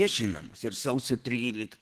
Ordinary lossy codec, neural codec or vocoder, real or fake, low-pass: Opus, 16 kbps; codec, 32 kHz, 1.9 kbps, SNAC; fake; 14.4 kHz